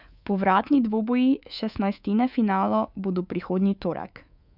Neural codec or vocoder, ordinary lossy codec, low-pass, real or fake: codec, 24 kHz, 3.1 kbps, DualCodec; none; 5.4 kHz; fake